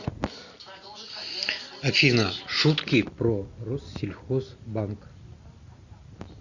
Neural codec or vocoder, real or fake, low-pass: none; real; 7.2 kHz